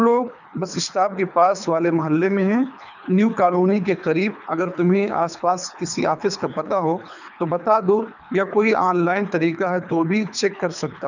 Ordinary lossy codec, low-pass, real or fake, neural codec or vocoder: none; 7.2 kHz; fake; codec, 24 kHz, 3 kbps, HILCodec